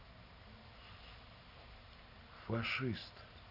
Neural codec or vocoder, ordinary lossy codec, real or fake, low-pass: none; none; real; 5.4 kHz